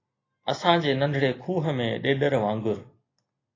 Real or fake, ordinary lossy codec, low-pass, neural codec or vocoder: real; AAC, 32 kbps; 7.2 kHz; none